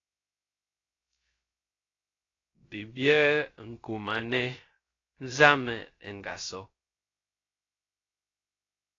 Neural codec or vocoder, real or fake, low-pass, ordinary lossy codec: codec, 16 kHz, 0.3 kbps, FocalCodec; fake; 7.2 kHz; AAC, 32 kbps